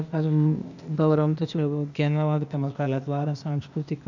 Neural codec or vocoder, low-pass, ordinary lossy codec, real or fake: codec, 16 kHz, 0.8 kbps, ZipCodec; 7.2 kHz; none; fake